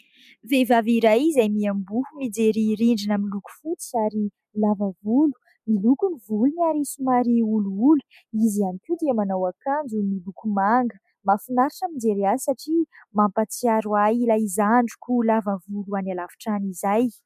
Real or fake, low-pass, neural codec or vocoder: real; 14.4 kHz; none